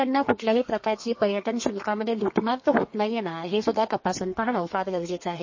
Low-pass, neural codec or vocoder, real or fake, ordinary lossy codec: 7.2 kHz; codec, 32 kHz, 1.9 kbps, SNAC; fake; MP3, 32 kbps